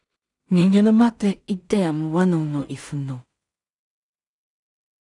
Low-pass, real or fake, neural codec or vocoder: 10.8 kHz; fake; codec, 16 kHz in and 24 kHz out, 0.4 kbps, LongCat-Audio-Codec, two codebook decoder